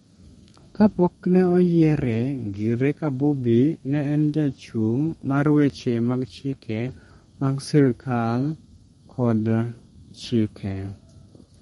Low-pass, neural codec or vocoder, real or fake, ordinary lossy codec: 14.4 kHz; codec, 32 kHz, 1.9 kbps, SNAC; fake; MP3, 48 kbps